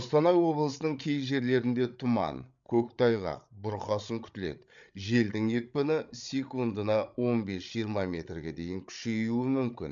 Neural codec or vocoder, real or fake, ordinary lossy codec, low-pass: codec, 16 kHz, 8 kbps, FreqCodec, larger model; fake; MP3, 64 kbps; 7.2 kHz